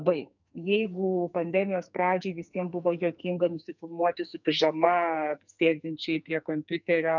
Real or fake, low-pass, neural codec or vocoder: fake; 7.2 kHz; codec, 44.1 kHz, 2.6 kbps, SNAC